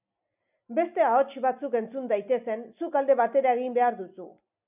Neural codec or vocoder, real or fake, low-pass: none; real; 3.6 kHz